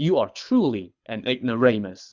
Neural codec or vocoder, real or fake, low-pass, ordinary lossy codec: codec, 24 kHz, 6 kbps, HILCodec; fake; 7.2 kHz; Opus, 64 kbps